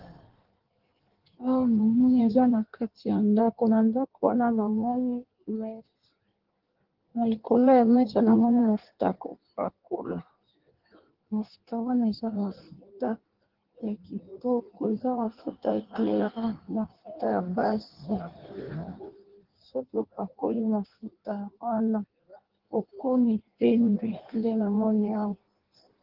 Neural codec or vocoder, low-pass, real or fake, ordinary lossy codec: codec, 16 kHz in and 24 kHz out, 1.1 kbps, FireRedTTS-2 codec; 5.4 kHz; fake; Opus, 16 kbps